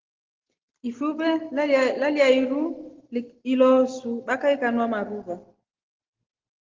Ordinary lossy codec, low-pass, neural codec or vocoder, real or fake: Opus, 16 kbps; 7.2 kHz; none; real